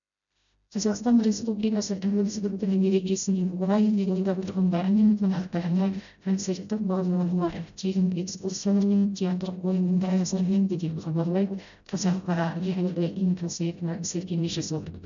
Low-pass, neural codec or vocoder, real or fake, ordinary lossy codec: 7.2 kHz; codec, 16 kHz, 0.5 kbps, FreqCodec, smaller model; fake; none